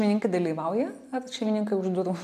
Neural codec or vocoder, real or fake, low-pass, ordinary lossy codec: none; real; 14.4 kHz; MP3, 96 kbps